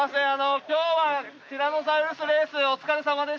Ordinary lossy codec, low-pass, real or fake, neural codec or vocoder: none; none; real; none